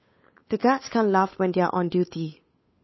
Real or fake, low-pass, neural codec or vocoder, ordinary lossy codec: fake; 7.2 kHz; codec, 16 kHz, 8 kbps, FunCodec, trained on Chinese and English, 25 frames a second; MP3, 24 kbps